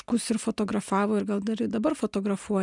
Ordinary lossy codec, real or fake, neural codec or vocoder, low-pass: MP3, 96 kbps; real; none; 10.8 kHz